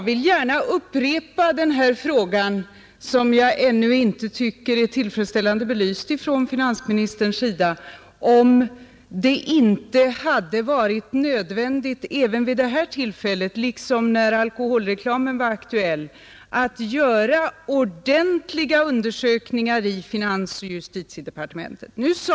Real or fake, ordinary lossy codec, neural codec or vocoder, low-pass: real; none; none; none